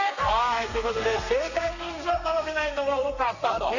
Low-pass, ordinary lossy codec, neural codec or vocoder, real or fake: 7.2 kHz; none; codec, 32 kHz, 1.9 kbps, SNAC; fake